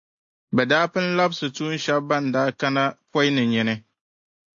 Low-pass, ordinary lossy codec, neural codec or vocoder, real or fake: 7.2 kHz; AAC, 64 kbps; none; real